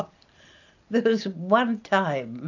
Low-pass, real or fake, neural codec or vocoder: 7.2 kHz; real; none